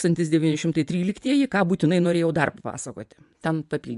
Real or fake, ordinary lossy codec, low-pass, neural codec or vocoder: fake; AAC, 96 kbps; 10.8 kHz; vocoder, 24 kHz, 100 mel bands, Vocos